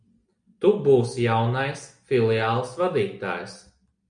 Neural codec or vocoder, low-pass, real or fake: none; 9.9 kHz; real